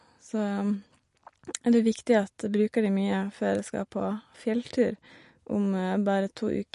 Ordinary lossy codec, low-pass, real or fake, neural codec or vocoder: MP3, 48 kbps; 14.4 kHz; real; none